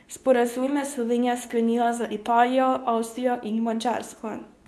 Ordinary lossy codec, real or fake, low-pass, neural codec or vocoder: none; fake; none; codec, 24 kHz, 0.9 kbps, WavTokenizer, medium speech release version 2